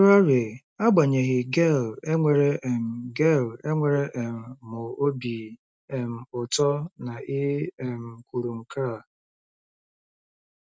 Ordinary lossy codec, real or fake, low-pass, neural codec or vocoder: none; real; none; none